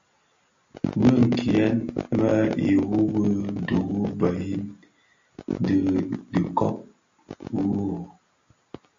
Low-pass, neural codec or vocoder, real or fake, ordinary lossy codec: 7.2 kHz; none; real; AAC, 64 kbps